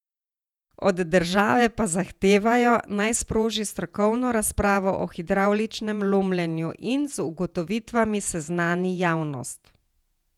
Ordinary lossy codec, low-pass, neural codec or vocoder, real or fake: none; 19.8 kHz; vocoder, 48 kHz, 128 mel bands, Vocos; fake